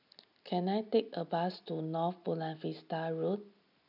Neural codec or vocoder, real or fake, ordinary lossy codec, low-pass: none; real; none; 5.4 kHz